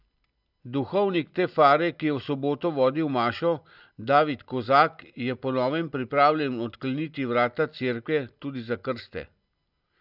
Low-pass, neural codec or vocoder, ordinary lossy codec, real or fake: 5.4 kHz; none; none; real